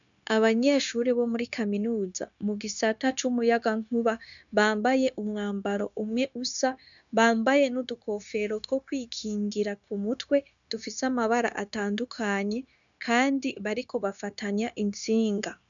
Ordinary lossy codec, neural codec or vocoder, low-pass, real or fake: MP3, 96 kbps; codec, 16 kHz, 0.9 kbps, LongCat-Audio-Codec; 7.2 kHz; fake